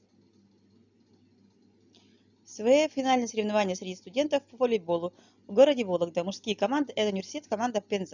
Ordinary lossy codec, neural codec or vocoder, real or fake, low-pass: none; none; real; 7.2 kHz